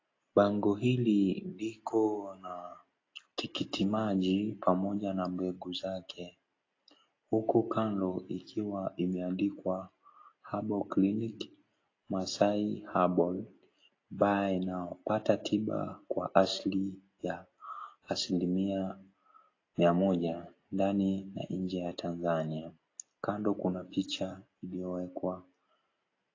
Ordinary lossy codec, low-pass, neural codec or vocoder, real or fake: AAC, 32 kbps; 7.2 kHz; none; real